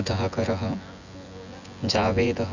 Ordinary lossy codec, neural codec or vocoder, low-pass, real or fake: none; vocoder, 24 kHz, 100 mel bands, Vocos; 7.2 kHz; fake